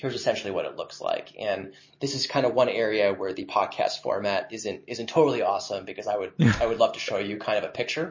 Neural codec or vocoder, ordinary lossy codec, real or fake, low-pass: none; MP3, 32 kbps; real; 7.2 kHz